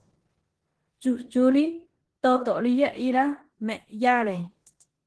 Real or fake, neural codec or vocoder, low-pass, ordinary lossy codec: fake; codec, 16 kHz in and 24 kHz out, 0.9 kbps, LongCat-Audio-Codec, four codebook decoder; 10.8 kHz; Opus, 16 kbps